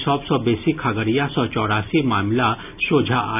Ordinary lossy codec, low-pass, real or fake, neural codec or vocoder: none; 3.6 kHz; real; none